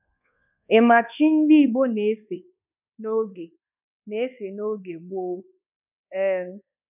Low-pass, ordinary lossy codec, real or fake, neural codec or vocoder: 3.6 kHz; none; fake; codec, 24 kHz, 1.2 kbps, DualCodec